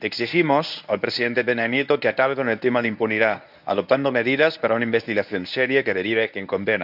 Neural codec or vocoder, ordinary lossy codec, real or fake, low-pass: codec, 24 kHz, 0.9 kbps, WavTokenizer, medium speech release version 1; none; fake; 5.4 kHz